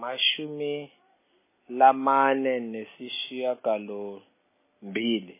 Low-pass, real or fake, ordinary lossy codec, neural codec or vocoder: 3.6 kHz; real; MP3, 16 kbps; none